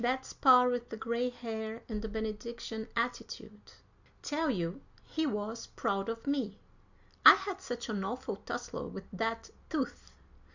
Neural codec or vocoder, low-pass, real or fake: none; 7.2 kHz; real